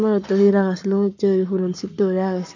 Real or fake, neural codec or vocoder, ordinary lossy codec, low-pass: fake; codec, 44.1 kHz, 7.8 kbps, Pupu-Codec; none; 7.2 kHz